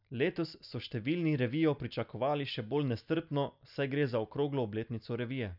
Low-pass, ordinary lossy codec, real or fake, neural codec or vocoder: 5.4 kHz; none; real; none